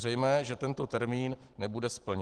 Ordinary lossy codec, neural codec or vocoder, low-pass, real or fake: Opus, 16 kbps; none; 10.8 kHz; real